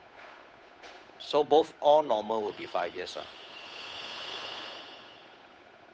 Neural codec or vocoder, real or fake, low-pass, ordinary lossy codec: codec, 16 kHz, 8 kbps, FunCodec, trained on Chinese and English, 25 frames a second; fake; none; none